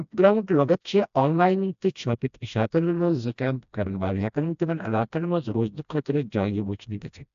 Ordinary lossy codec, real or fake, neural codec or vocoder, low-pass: AAC, 96 kbps; fake; codec, 16 kHz, 1 kbps, FreqCodec, smaller model; 7.2 kHz